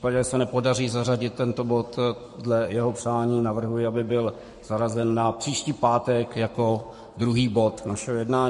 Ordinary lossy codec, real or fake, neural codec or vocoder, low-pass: MP3, 48 kbps; fake; codec, 44.1 kHz, 7.8 kbps, Pupu-Codec; 14.4 kHz